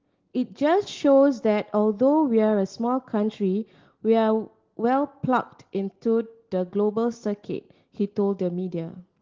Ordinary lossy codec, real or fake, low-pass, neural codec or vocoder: Opus, 16 kbps; real; 7.2 kHz; none